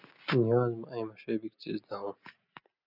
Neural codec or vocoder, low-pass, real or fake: none; 5.4 kHz; real